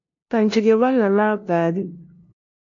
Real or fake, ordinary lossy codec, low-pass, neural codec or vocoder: fake; MP3, 48 kbps; 7.2 kHz; codec, 16 kHz, 0.5 kbps, FunCodec, trained on LibriTTS, 25 frames a second